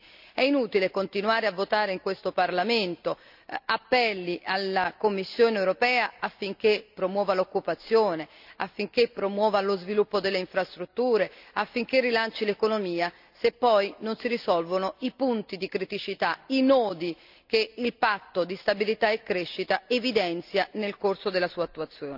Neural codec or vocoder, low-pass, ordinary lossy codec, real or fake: none; 5.4 kHz; none; real